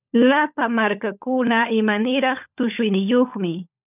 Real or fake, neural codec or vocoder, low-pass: fake; codec, 16 kHz, 16 kbps, FunCodec, trained on LibriTTS, 50 frames a second; 3.6 kHz